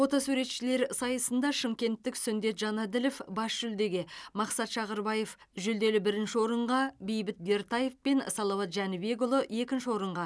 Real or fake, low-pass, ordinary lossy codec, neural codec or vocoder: real; none; none; none